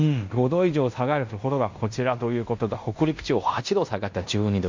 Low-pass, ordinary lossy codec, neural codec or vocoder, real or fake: 7.2 kHz; MP3, 64 kbps; codec, 16 kHz in and 24 kHz out, 0.9 kbps, LongCat-Audio-Codec, fine tuned four codebook decoder; fake